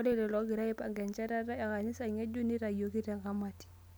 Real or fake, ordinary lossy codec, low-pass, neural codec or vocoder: real; none; none; none